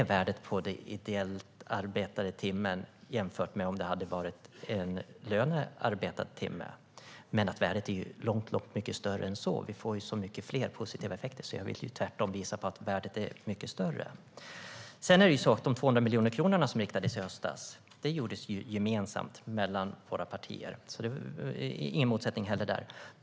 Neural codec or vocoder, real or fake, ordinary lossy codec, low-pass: none; real; none; none